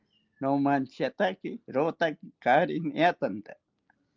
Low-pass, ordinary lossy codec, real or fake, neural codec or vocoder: 7.2 kHz; Opus, 24 kbps; real; none